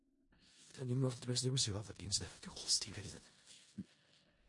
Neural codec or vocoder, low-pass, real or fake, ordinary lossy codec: codec, 16 kHz in and 24 kHz out, 0.4 kbps, LongCat-Audio-Codec, four codebook decoder; 10.8 kHz; fake; MP3, 48 kbps